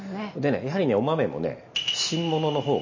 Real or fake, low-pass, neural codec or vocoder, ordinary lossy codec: real; 7.2 kHz; none; MP3, 32 kbps